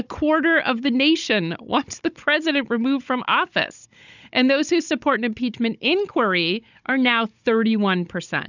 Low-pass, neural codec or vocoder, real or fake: 7.2 kHz; codec, 16 kHz, 16 kbps, FunCodec, trained on Chinese and English, 50 frames a second; fake